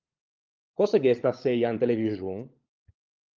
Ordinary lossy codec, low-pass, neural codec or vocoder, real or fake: Opus, 32 kbps; 7.2 kHz; codec, 16 kHz, 16 kbps, FunCodec, trained on LibriTTS, 50 frames a second; fake